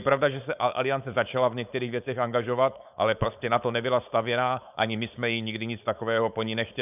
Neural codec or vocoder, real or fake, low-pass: codec, 16 kHz, 4.8 kbps, FACodec; fake; 3.6 kHz